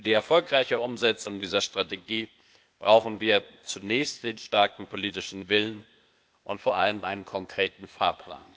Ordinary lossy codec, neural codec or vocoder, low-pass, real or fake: none; codec, 16 kHz, 0.8 kbps, ZipCodec; none; fake